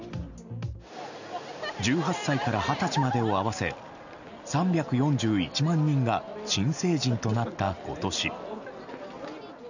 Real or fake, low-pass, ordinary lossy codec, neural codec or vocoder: real; 7.2 kHz; none; none